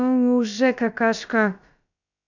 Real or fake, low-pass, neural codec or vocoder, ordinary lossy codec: fake; 7.2 kHz; codec, 16 kHz, about 1 kbps, DyCAST, with the encoder's durations; none